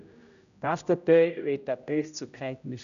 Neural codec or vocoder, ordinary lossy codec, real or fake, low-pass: codec, 16 kHz, 0.5 kbps, X-Codec, HuBERT features, trained on general audio; none; fake; 7.2 kHz